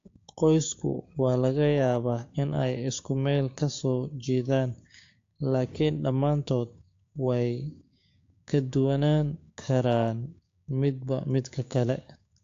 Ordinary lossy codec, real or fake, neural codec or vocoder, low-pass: AAC, 48 kbps; fake; codec, 16 kHz, 6 kbps, DAC; 7.2 kHz